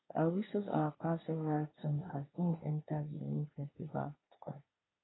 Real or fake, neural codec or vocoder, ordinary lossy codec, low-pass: fake; codec, 24 kHz, 1 kbps, SNAC; AAC, 16 kbps; 7.2 kHz